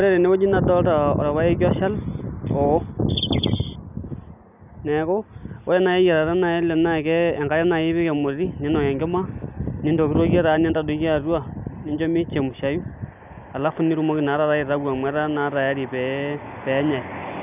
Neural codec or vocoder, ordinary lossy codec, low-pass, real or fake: none; none; 3.6 kHz; real